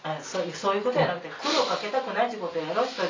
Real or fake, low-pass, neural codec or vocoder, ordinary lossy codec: real; 7.2 kHz; none; MP3, 48 kbps